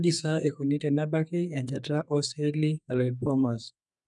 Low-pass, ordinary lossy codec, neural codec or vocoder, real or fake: 10.8 kHz; none; codec, 32 kHz, 1.9 kbps, SNAC; fake